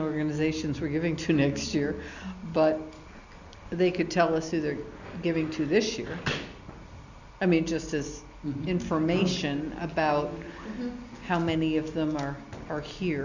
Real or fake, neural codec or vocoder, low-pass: real; none; 7.2 kHz